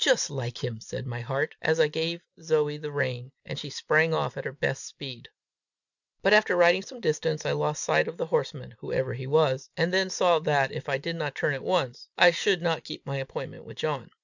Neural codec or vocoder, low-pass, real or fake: none; 7.2 kHz; real